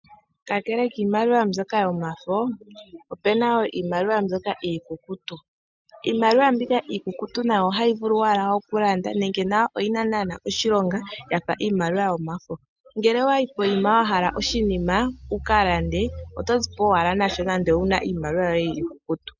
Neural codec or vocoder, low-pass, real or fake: none; 7.2 kHz; real